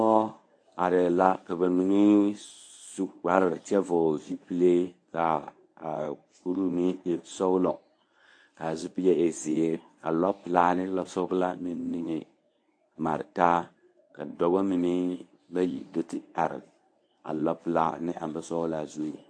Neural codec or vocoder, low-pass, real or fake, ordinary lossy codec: codec, 24 kHz, 0.9 kbps, WavTokenizer, medium speech release version 1; 9.9 kHz; fake; AAC, 48 kbps